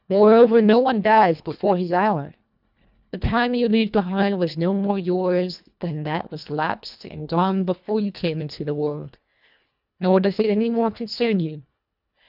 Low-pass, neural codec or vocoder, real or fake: 5.4 kHz; codec, 24 kHz, 1.5 kbps, HILCodec; fake